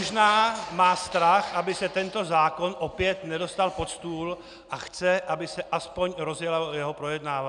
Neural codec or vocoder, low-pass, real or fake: none; 9.9 kHz; real